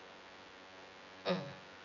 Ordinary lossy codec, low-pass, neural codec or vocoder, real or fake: none; 7.2 kHz; vocoder, 24 kHz, 100 mel bands, Vocos; fake